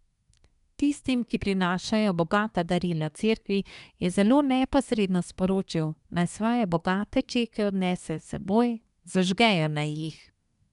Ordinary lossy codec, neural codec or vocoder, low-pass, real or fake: none; codec, 24 kHz, 1 kbps, SNAC; 10.8 kHz; fake